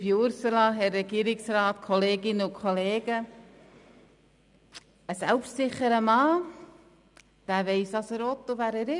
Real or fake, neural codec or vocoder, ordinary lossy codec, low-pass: real; none; none; 10.8 kHz